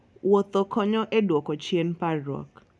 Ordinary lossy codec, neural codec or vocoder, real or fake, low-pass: none; none; real; none